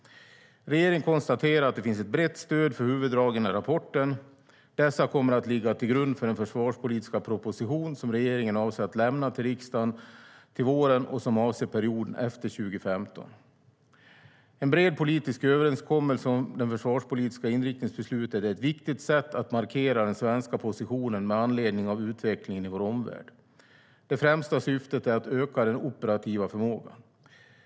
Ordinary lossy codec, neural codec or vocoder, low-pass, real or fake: none; none; none; real